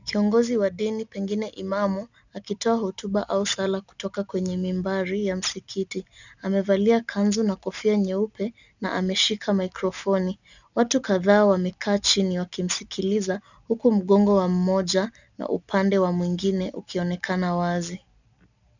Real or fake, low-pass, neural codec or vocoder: real; 7.2 kHz; none